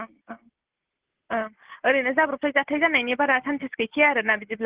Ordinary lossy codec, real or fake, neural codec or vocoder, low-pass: Opus, 16 kbps; real; none; 3.6 kHz